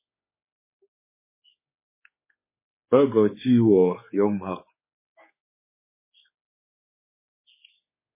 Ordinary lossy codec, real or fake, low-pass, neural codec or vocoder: MP3, 16 kbps; fake; 3.6 kHz; codec, 16 kHz, 4 kbps, X-Codec, HuBERT features, trained on general audio